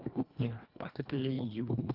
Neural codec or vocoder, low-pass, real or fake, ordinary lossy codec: codec, 24 kHz, 1.5 kbps, HILCodec; 5.4 kHz; fake; Opus, 32 kbps